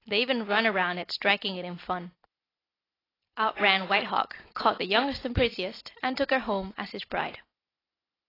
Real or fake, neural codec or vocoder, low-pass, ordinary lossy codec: real; none; 5.4 kHz; AAC, 24 kbps